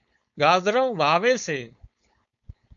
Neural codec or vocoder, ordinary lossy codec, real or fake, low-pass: codec, 16 kHz, 4.8 kbps, FACodec; MP3, 96 kbps; fake; 7.2 kHz